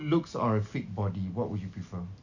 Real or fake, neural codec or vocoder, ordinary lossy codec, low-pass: real; none; none; 7.2 kHz